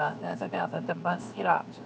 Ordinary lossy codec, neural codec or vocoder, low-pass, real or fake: none; codec, 16 kHz, 0.7 kbps, FocalCodec; none; fake